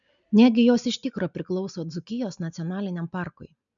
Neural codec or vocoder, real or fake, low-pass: none; real; 7.2 kHz